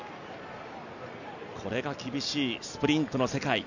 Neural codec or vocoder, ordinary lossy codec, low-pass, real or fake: none; none; 7.2 kHz; real